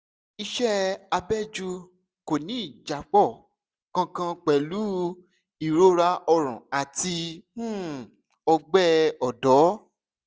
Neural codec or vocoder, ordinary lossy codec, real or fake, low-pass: none; none; real; none